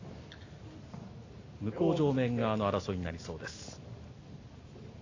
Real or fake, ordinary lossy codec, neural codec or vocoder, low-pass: real; AAC, 32 kbps; none; 7.2 kHz